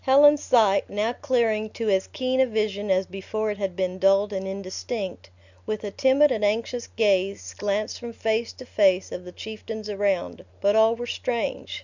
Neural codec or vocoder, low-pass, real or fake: none; 7.2 kHz; real